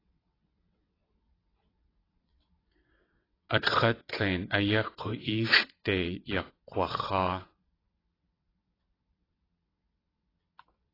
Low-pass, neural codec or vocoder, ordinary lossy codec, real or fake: 5.4 kHz; vocoder, 22.05 kHz, 80 mel bands, WaveNeXt; AAC, 24 kbps; fake